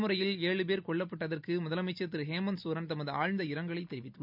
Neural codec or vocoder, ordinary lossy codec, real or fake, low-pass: none; none; real; 5.4 kHz